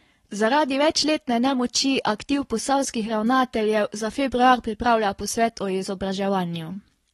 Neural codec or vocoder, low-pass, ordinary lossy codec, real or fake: codec, 44.1 kHz, 7.8 kbps, Pupu-Codec; 19.8 kHz; AAC, 32 kbps; fake